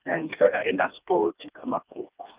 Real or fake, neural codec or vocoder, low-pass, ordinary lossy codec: fake; codec, 16 kHz, 2 kbps, FreqCodec, smaller model; 3.6 kHz; Opus, 24 kbps